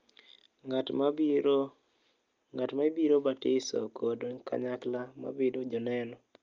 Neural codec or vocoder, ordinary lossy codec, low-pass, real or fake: none; Opus, 24 kbps; 7.2 kHz; real